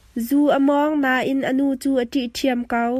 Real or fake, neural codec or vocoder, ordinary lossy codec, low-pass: real; none; MP3, 64 kbps; 14.4 kHz